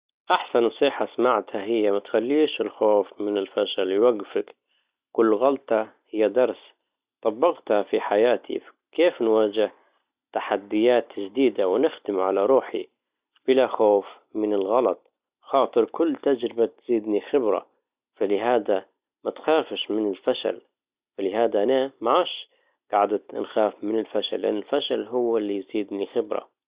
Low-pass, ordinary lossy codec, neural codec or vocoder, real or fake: 3.6 kHz; Opus, 64 kbps; none; real